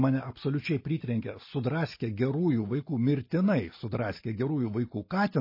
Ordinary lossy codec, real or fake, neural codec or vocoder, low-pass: MP3, 24 kbps; real; none; 5.4 kHz